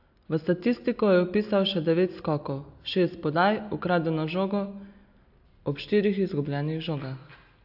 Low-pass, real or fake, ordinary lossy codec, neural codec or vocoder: 5.4 kHz; real; AAC, 48 kbps; none